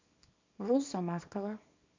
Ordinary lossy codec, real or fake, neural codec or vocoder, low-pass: MP3, 48 kbps; fake; codec, 24 kHz, 0.9 kbps, WavTokenizer, small release; 7.2 kHz